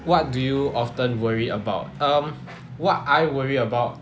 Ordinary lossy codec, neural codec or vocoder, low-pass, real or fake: none; none; none; real